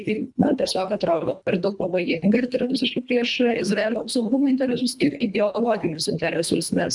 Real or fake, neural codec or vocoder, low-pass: fake; codec, 24 kHz, 1.5 kbps, HILCodec; 10.8 kHz